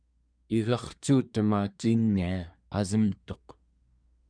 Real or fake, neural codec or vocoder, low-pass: fake; codec, 24 kHz, 1 kbps, SNAC; 9.9 kHz